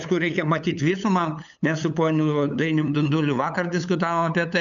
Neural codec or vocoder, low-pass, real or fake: codec, 16 kHz, 8 kbps, FunCodec, trained on LibriTTS, 25 frames a second; 7.2 kHz; fake